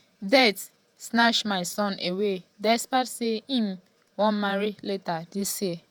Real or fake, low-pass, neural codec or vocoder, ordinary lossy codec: fake; none; vocoder, 48 kHz, 128 mel bands, Vocos; none